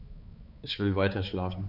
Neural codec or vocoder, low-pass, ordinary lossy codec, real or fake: codec, 16 kHz, 4 kbps, X-Codec, HuBERT features, trained on balanced general audio; 5.4 kHz; none; fake